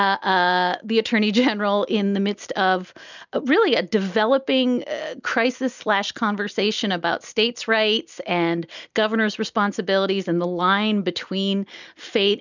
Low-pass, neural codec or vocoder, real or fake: 7.2 kHz; none; real